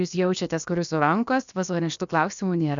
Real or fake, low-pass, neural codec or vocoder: fake; 7.2 kHz; codec, 16 kHz, about 1 kbps, DyCAST, with the encoder's durations